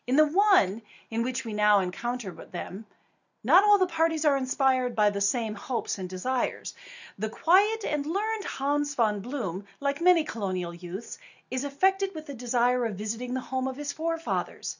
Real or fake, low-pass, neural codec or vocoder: real; 7.2 kHz; none